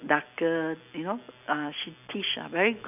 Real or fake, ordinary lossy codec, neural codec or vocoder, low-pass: real; none; none; 3.6 kHz